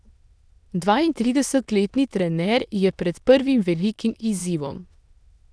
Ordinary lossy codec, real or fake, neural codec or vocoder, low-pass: none; fake; autoencoder, 22.05 kHz, a latent of 192 numbers a frame, VITS, trained on many speakers; none